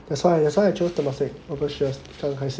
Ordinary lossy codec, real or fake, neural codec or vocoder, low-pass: none; real; none; none